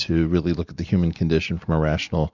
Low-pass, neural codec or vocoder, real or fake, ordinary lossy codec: 7.2 kHz; none; real; Opus, 64 kbps